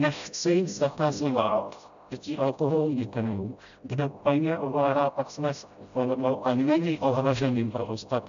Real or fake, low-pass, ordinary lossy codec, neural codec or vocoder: fake; 7.2 kHz; AAC, 96 kbps; codec, 16 kHz, 0.5 kbps, FreqCodec, smaller model